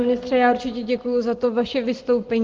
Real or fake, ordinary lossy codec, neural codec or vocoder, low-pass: real; Opus, 16 kbps; none; 7.2 kHz